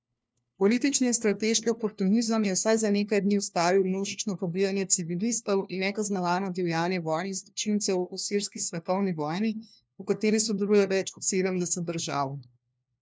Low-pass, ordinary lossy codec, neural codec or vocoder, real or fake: none; none; codec, 16 kHz, 1 kbps, FunCodec, trained on LibriTTS, 50 frames a second; fake